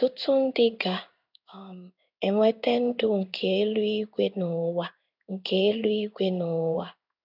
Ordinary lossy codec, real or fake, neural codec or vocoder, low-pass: none; fake; codec, 16 kHz in and 24 kHz out, 1 kbps, XY-Tokenizer; 5.4 kHz